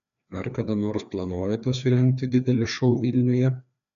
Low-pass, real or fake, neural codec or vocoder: 7.2 kHz; fake; codec, 16 kHz, 2 kbps, FreqCodec, larger model